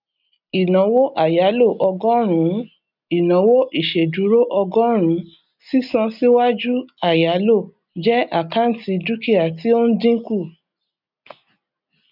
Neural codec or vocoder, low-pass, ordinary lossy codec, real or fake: none; 5.4 kHz; none; real